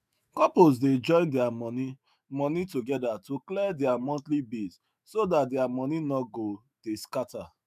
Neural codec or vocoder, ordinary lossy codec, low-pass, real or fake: autoencoder, 48 kHz, 128 numbers a frame, DAC-VAE, trained on Japanese speech; none; 14.4 kHz; fake